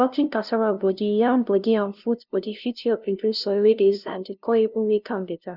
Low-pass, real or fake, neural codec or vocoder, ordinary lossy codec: 5.4 kHz; fake; codec, 16 kHz, 0.5 kbps, FunCodec, trained on LibriTTS, 25 frames a second; none